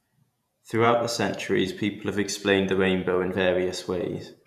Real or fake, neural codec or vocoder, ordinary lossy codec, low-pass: fake; vocoder, 48 kHz, 128 mel bands, Vocos; none; 14.4 kHz